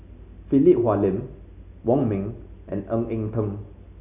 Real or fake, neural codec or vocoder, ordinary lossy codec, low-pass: real; none; none; 3.6 kHz